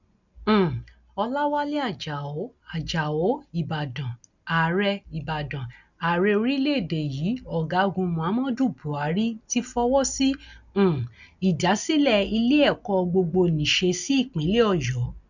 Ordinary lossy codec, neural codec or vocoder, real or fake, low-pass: none; none; real; 7.2 kHz